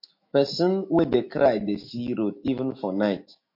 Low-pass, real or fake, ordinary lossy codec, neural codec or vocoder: 5.4 kHz; fake; MP3, 32 kbps; autoencoder, 48 kHz, 128 numbers a frame, DAC-VAE, trained on Japanese speech